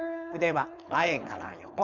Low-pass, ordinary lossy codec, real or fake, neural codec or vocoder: 7.2 kHz; none; fake; codec, 16 kHz, 4.8 kbps, FACodec